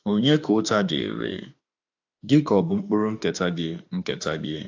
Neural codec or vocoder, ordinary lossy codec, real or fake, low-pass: autoencoder, 48 kHz, 32 numbers a frame, DAC-VAE, trained on Japanese speech; AAC, 48 kbps; fake; 7.2 kHz